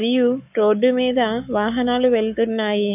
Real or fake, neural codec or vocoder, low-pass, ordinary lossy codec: fake; codec, 44.1 kHz, 7.8 kbps, Pupu-Codec; 3.6 kHz; none